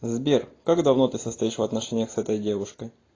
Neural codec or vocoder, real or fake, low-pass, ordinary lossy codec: none; real; 7.2 kHz; AAC, 32 kbps